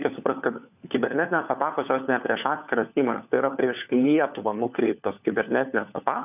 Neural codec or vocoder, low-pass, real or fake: codec, 16 kHz, 4 kbps, FunCodec, trained on LibriTTS, 50 frames a second; 3.6 kHz; fake